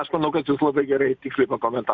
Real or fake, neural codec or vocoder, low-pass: real; none; 7.2 kHz